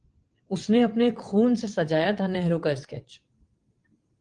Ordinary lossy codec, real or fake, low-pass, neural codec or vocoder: Opus, 24 kbps; fake; 9.9 kHz; vocoder, 22.05 kHz, 80 mel bands, WaveNeXt